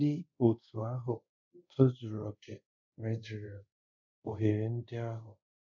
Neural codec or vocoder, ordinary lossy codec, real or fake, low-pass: codec, 24 kHz, 0.5 kbps, DualCodec; none; fake; 7.2 kHz